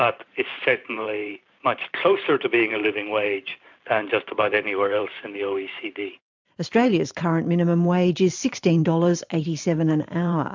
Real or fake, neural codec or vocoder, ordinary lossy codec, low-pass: fake; vocoder, 44.1 kHz, 128 mel bands every 512 samples, BigVGAN v2; MP3, 64 kbps; 7.2 kHz